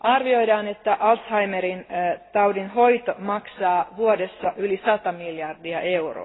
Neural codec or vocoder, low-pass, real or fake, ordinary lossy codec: none; 7.2 kHz; real; AAC, 16 kbps